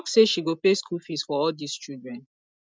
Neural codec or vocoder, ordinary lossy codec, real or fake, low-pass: none; none; real; none